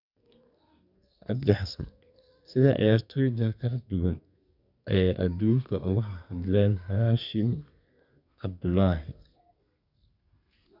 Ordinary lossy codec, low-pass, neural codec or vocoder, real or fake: none; 5.4 kHz; codec, 32 kHz, 1.9 kbps, SNAC; fake